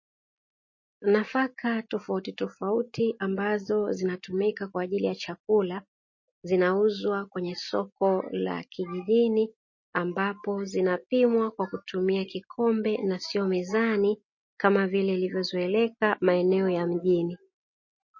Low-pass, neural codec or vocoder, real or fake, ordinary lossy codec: 7.2 kHz; none; real; MP3, 32 kbps